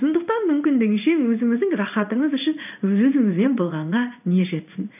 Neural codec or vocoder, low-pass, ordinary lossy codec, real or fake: codec, 16 kHz in and 24 kHz out, 1 kbps, XY-Tokenizer; 3.6 kHz; none; fake